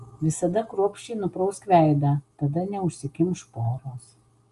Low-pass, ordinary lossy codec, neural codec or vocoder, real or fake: 10.8 kHz; Opus, 32 kbps; none; real